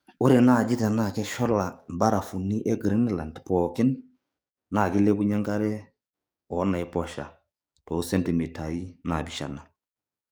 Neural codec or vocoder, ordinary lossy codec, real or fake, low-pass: codec, 44.1 kHz, 7.8 kbps, DAC; none; fake; none